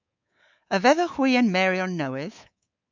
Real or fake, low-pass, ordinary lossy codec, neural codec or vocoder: fake; 7.2 kHz; MP3, 64 kbps; codec, 24 kHz, 3.1 kbps, DualCodec